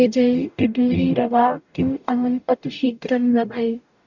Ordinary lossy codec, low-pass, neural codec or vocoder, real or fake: none; 7.2 kHz; codec, 44.1 kHz, 0.9 kbps, DAC; fake